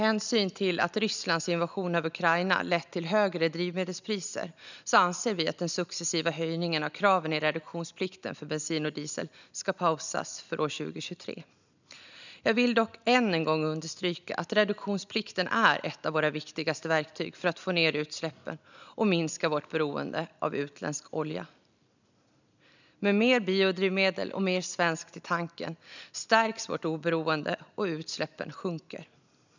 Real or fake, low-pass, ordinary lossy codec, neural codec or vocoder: real; 7.2 kHz; none; none